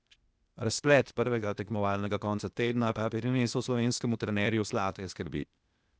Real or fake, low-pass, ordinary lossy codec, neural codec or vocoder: fake; none; none; codec, 16 kHz, 0.8 kbps, ZipCodec